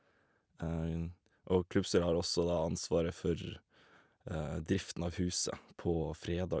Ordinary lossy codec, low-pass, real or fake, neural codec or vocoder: none; none; real; none